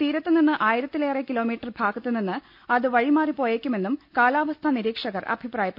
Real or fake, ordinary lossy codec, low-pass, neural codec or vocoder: real; none; 5.4 kHz; none